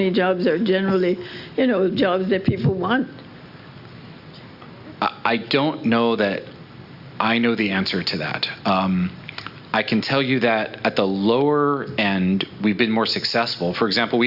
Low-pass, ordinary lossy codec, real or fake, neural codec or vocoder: 5.4 kHz; Opus, 64 kbps; real; none